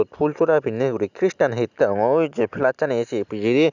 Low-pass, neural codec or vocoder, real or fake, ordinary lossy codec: 7.2 kHz; none; real; none